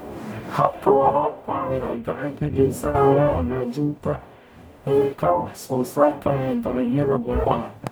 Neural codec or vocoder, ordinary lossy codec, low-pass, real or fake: codec, 44.1 kHz, 0.9 kbps, DAC; none; none; fake